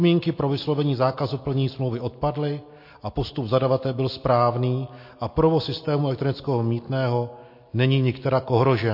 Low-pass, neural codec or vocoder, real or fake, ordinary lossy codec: 5.4 kHz; none; real; MP3, 32 kbps